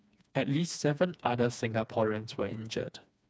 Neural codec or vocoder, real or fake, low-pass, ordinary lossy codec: codec, 16 kHz, 2 kbps, FreqCodec, smaller model; fake; none; none